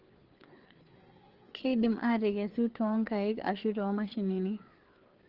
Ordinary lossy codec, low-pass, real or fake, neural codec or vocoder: Opus, 16 kbps; 5.4 kHz; fake; codec, 16 kHz, 4 kbps, FreqCodec, larger model